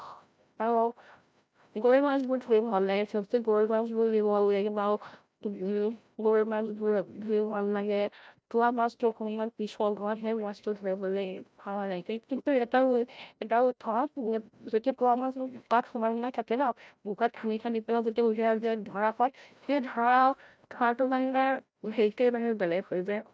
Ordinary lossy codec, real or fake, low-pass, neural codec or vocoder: none; fake; none; codec, 16 kHz, 0.5 kbps, FreqCodec, larger model